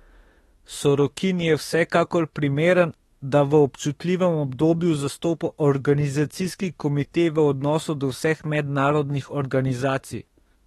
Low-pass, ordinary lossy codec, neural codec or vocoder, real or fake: 19.8 kHz; AAC, 32 kbps; autoencoder, 48 kHz, 32 numbers a frame, DAC-VAE, trained on Japanese speech; fake